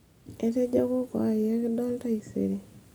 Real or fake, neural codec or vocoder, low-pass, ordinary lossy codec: real; none; none; none